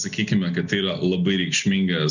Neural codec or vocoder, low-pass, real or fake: none; 7.2 kHz; real